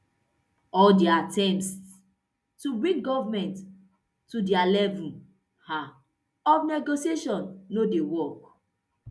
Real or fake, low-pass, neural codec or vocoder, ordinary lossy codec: real; none; none; none